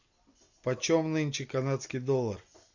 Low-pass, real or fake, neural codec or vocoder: 7.2 kHz; real; none